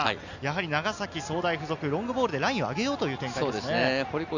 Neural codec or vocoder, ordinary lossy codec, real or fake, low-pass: none; none; real; 7.2 kHz